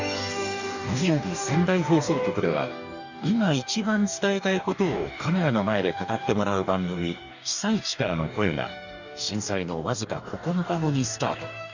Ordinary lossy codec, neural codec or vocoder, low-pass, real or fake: none; codec, 44.1 kHz, 2.6 kbps, DAC; 7.2 kHz; fake